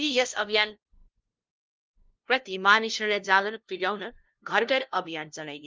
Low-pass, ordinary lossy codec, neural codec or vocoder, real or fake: 7.2 kHz; Opus, 32 kbps; codec, 16 kHz, 0.5 kbps, FunCodec, trained on LibriTTS, 25 frames a second; fake